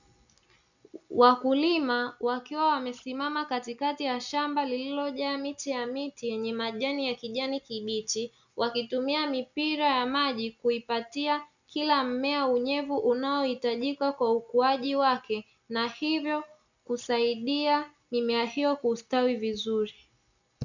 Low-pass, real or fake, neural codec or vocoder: 7.2 kHz; real; none